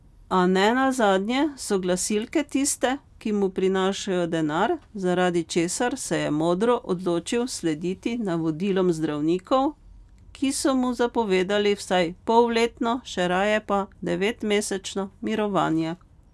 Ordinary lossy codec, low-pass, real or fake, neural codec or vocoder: none; none; real; none